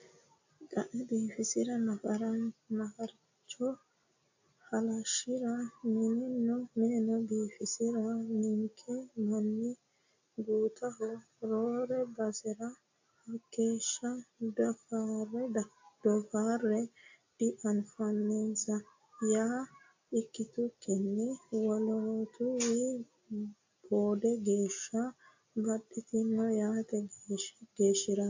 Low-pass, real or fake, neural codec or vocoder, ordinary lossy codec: 7.2 kHz; real; none; AAC, 48 kbps